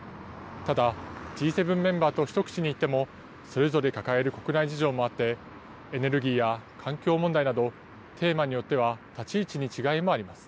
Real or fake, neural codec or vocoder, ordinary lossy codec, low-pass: real; none; none; none